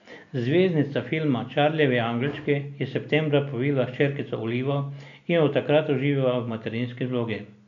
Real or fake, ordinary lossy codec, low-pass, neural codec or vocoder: real; AAC, 96 kbps; 7.2 kHz; none